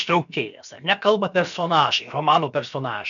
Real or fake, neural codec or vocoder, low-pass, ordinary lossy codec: fake; codec, 16 kHz, about 1 kbps, DyCAST, with the encoder's durations; 7.2 kHz; MP3, 96 kbps